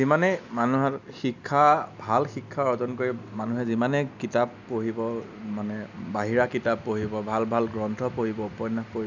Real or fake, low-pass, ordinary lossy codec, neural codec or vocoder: real; 7.2 kHz; none; none